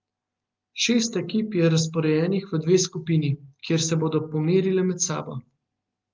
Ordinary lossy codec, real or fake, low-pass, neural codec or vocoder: Opus, 24 kbps; real; 7.2 kHz; none